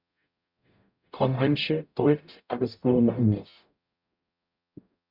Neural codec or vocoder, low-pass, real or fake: codec, 44.1 kHz, 0.9 kbps, DAC; 5.4 kHz; fake